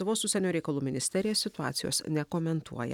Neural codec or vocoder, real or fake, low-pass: none; real; 19.8 kHz